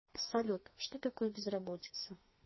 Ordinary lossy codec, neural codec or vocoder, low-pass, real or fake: MP3, 24 kbps; codec, 32 kHz, 1.9 kbps, SNAC; 7.2 kHz; fake